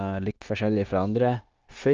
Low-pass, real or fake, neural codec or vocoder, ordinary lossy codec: 7.2 kHz; fake; codec, 16 kHz, 0.9 kbps, LongCat-Audio-Codec; Opus, 24 kbps